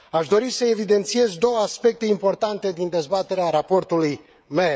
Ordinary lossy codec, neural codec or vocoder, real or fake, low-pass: none; codec, 16 kHz, 16 kbps, FreqCodec, smaller model; fake; none